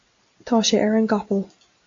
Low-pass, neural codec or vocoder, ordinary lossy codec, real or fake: 7.2 kHz; none; AAC, 48 kbps; real